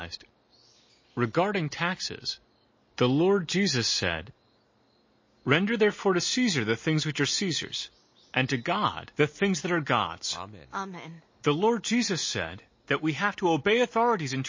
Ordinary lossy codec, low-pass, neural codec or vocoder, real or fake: MP3, 32 kbps; 7.2 kHz; none; real